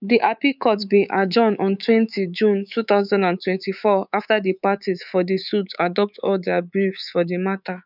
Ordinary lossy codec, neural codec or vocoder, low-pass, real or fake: none; codec, 24 kHz, 3.1 kbps, DualCodec; 5.4 kHz; fake